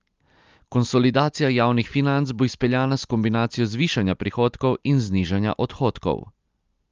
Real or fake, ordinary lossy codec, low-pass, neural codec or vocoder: real; Opus, 32 kbps; 7.2 kHz; none